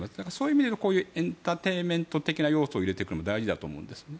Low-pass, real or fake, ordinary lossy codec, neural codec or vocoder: none; real; none; none